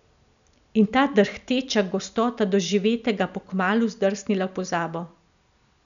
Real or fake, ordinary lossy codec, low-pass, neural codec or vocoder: real; none; 7.2 kHz; none